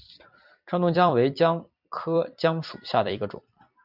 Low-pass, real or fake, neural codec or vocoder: 5.4 kHz; fake; vocoder, 44.1 kHz, 128 mel bands every 256 samples, BigVGAN v2